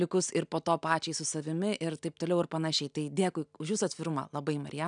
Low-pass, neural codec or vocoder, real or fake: 9.9 kHz; none; real